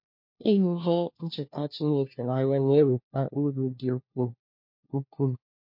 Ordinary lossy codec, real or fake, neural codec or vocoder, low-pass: MP3, 32 kbps; fake; codec, 16 kHz, 1 kbps, FunCodec, trained on LibriTTS, 50 frames a second; 5.4 kHz